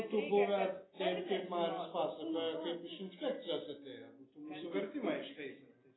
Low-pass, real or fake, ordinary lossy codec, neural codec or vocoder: 7.2 kHz; real; AAC, 16 kbps; none